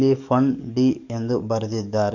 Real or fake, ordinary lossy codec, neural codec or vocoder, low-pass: fake; none; codec, 44.1 kHz, 7.8 kbps, DAC; 7.2 kHz